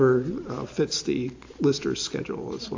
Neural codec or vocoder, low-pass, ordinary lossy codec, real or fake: none; 7.2 kHz; AAC, 48 kbps; real